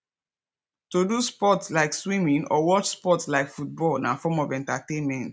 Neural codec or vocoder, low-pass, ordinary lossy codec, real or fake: none; none; none; real